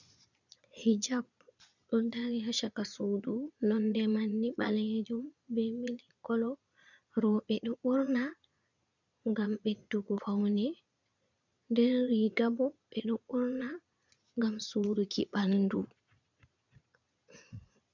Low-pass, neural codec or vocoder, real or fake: 7.2 kHz; vocoder, 22.05 kHz, 80 mel bands, Vocos; fake